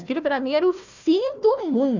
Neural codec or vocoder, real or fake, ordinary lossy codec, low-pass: codec, 16 kHz, 1 kbps, FunCodec, trained on Chinese and English, 50 frames a second; fake; none; 7.2 kHz